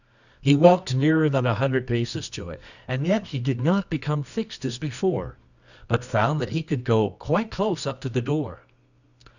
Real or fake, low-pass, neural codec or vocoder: fake; 7.2 kHz; codec, 24 kHz, 0.9 kbps, WavTokenizer, medium music audio release